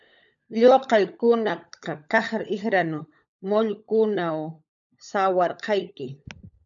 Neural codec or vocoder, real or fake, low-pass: codec, 16 kHz, 16 kbps, FunCodec, trained on LibriTTS, 50 frames a second; fake; 7.2 kHz